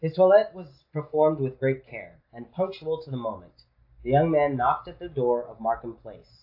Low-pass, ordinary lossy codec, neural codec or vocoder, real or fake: 5.4 kHz; AAC, 32 kbps; none; real